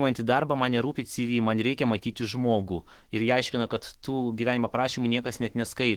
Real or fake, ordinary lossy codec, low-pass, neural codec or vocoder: fake; Opus, 16 kbps; 19.8 kHz; autoencoder, 48 kHz, 32 numbers a frame, DAC-VAE, trained on Japanese speech